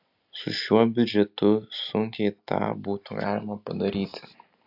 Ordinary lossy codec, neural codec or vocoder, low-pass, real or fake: AAC, 48 kbps; none; 5.4 kHz; real